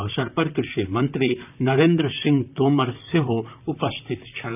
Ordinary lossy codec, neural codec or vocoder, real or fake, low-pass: none; vocoder, 44.1 kHz, 128 mel bands, Pupu-Vocoder; fake; 3.6 kHz